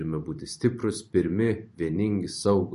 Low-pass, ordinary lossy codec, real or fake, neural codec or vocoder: 14.4 kHz; MP3, 48 kbps; fake; vocoder, 44.1 kHz, 128 mel bands every 512 samples, BigVGAN v2